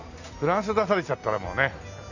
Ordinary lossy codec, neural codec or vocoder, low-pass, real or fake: none; none; 7.2 kHz; real